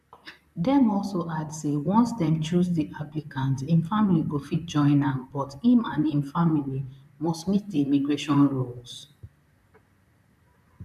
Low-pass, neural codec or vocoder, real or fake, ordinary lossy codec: 14.4 kHz; vocoder, 44.1 kHz, 128 mel bands, Pupu-Vocoder; fake; none